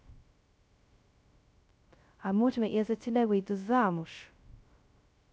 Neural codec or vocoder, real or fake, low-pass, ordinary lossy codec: codec, 16 kHz, 0.2 kbps, FocalCodec; fake; none; none